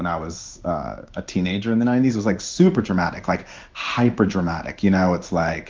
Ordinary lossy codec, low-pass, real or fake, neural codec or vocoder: Opus, 24 kbps; 7.2 kHz; fake; codec, 16 kHz in and 24 kHz out, 1 kbps, XY-Tokenizer